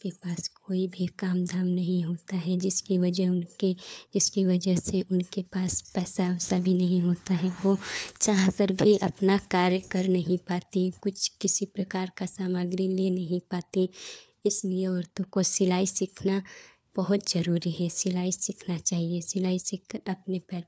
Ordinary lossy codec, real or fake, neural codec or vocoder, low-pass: none; fake; codec, 16 kHz, 4 kbps, FunCodec, trained on LibriTTS, 50 frames a second; none